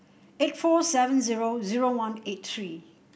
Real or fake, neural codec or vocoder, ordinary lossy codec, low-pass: real; none; none; none